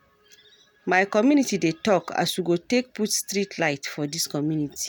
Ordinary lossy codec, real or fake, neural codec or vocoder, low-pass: none; real; none; none